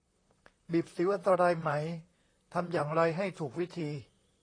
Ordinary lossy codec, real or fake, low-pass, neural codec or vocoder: AAC, 32 kbps; fake; 9.9 kHz; vocoder, 44.1 kHz, 128 mel bands, Pupu-Vocoder